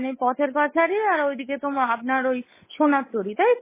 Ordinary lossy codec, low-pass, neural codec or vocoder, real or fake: MP3, 16 kbps; 3.6 kHz; codec, 16 kHz, 8 kbps, FunCodec, trained on Chinese and English, 25 frames a second; fake